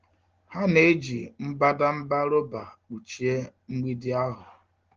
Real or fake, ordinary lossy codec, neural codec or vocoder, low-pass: real; Opus, 16 kbps; none; 7.2 kHz